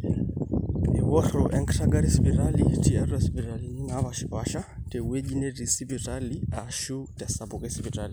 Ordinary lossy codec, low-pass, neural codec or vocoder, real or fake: none; none; none; real